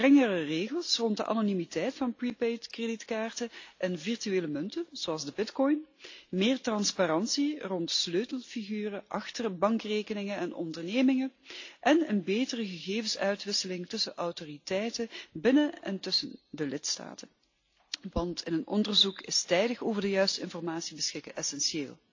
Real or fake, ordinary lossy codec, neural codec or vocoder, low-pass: real; AAC, 48 kbps; none; 7.2 kHz